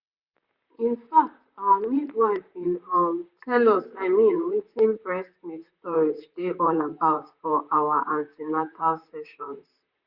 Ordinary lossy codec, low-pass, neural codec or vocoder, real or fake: Opus, 64 kbps; 5.4 kHz; vocoder, 44.1 kHz, 128 mel bands, Pupu-Vocoder; fake